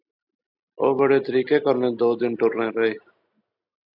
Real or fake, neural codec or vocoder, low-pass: real; none; 5.4 kHz